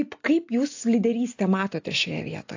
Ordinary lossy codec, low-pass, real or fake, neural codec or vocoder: AAC, 48 kbps; 7.2 kHz; real; none